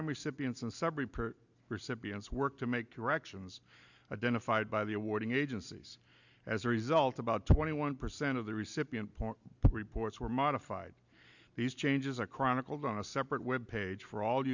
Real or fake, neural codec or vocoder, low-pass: real; none; 7.2 kHz